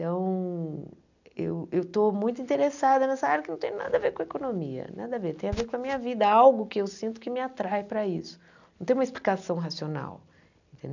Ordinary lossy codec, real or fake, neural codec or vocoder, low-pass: none; real; none; 7.2 kHz